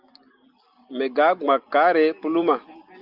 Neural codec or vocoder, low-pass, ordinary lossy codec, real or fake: none; 5.4 kHz; Opus, 24 kbps; real